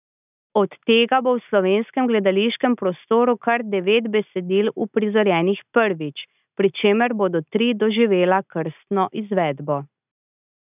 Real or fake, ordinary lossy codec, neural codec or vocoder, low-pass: real; none; none; 3.6 kHz